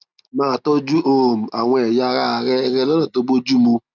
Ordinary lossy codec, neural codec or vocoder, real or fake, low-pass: none; none; real; 7.2 kHz